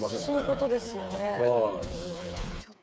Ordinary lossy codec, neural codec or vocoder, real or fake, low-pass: none; codec, 16 kHz, 4 kbps, FreqCodec, smaller model; fake; none